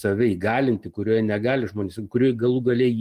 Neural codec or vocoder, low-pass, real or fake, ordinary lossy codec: none; 14.4 kHz; real; Opus, 24 kbps